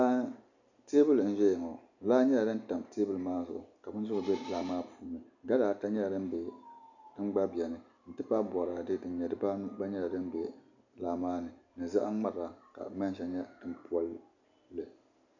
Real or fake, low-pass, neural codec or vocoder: real; 7.2 kHz; none